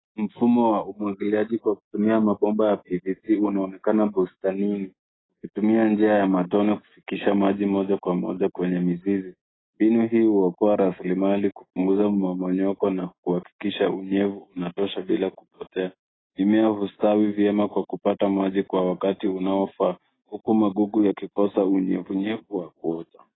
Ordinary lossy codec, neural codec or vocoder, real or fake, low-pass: AAC, 16 kbps; none; real; 7.2 kHz